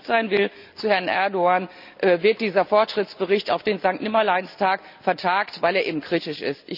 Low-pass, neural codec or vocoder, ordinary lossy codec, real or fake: 5.4 kHz; none; none; real